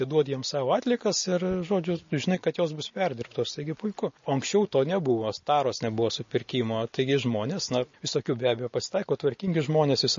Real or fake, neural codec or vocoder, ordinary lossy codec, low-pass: real; none; MP3, 32 kbps; 7.2 kHz